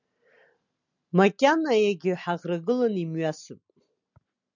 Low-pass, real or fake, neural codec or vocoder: 7.2 kHz; real; none